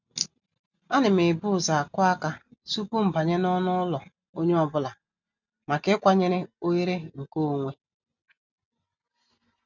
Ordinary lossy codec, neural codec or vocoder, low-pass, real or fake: none; none; 7.2 kHz; real